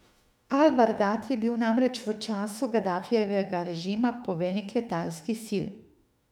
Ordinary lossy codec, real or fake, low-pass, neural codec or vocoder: none; fake; 19.8 kHz; autoencoder, 48 kHz, 32 numbers a frame, DAC-VAE, trained on Japanese speech